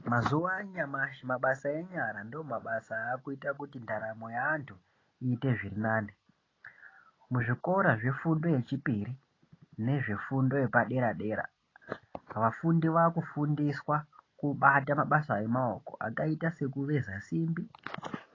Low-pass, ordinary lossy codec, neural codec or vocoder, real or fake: 7.2 kHz; AAC, 32 kbps; none; real